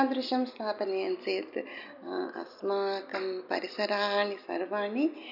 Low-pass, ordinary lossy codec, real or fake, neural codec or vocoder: 5.4 kHz; none; real; none